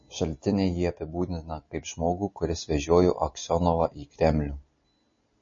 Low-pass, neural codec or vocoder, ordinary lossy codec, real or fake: 7.2 kHz; none; MP3, 32 kbps; real